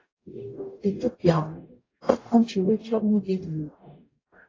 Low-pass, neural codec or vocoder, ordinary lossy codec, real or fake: 7.2 kHz; codec, 44.1 kHz, 0.9 kbps, DAC; AAC, 32 kbps; fake